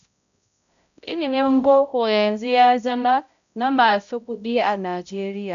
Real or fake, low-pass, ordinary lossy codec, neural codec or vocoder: fake; 7.2 kHz; none; codec, 16 kHz, 0.5 kbps, X-Codec, HuBERT features, trained on balanced general audio